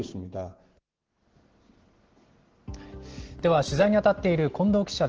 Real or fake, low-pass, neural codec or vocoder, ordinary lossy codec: real; 7.2 kHz; none; Opus, 16 kbps